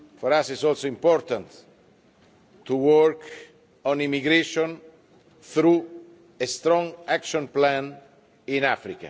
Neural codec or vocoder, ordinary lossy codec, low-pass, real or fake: none; none; none; real